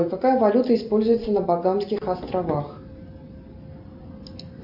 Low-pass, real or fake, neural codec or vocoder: 5.4 kHz; real; none